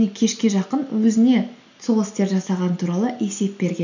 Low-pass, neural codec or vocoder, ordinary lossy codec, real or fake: 7.2 kHz; none; none; real